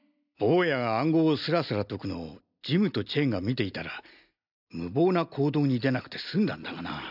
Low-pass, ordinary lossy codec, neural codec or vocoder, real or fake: 5.4 kHz; none; none; real